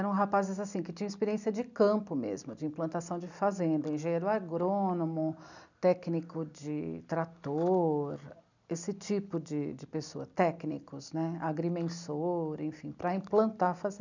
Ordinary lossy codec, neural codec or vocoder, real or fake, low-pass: none; none; real; 7.2 kHz